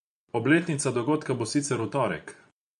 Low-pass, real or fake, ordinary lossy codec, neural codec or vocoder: 10.8 kHz; fake; MP3, 64 kbps; vocoder, 24 kHz, 100 mel bands, Vocos